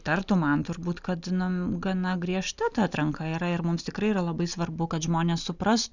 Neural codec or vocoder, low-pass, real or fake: none; 7.2 kHz; real